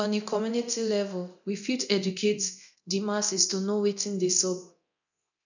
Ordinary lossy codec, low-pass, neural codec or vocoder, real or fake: none; 7.2 kHz; codec, 24 kHz, 0.9 kbps, DualCodec; fake